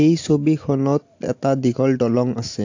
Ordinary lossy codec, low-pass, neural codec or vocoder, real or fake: AAC, 48 kbps; 7.2 kHz; none; real